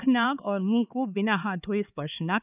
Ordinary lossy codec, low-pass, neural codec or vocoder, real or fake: none; 3.6 kHz; codec, 16 kHz, 4 kbps, X-Codec, HuBERT features, trained on LibriSpeech; fake